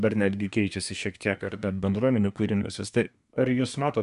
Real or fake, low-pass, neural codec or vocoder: fake; 10.8 kHz; codec, 24 kHz, 1 kbps, SNAC